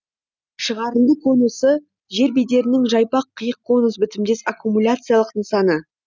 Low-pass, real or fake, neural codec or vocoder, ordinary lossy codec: none; real; none; none